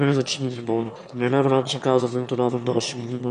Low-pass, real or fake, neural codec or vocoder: 9.9 kHz; fake; autoencoder, 22.05 kHz, a latent of 192 numbers a frame, VITS, trained on one speaker